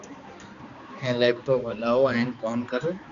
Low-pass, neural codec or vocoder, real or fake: 7.2 kHz; codec, 16 kHz, 2 kbps, X-Codec, HuBERT features, trained on balanced general audio; fake